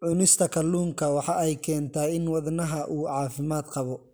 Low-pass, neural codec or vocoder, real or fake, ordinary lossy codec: none; none; real; none